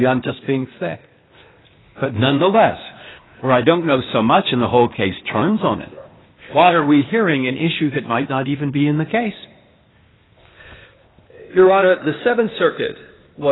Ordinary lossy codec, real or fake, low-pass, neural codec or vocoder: AAC, 16 kbps; fake; 7.2 kHz; codec, 16 kHz, 0.8 kbps, ZipCodec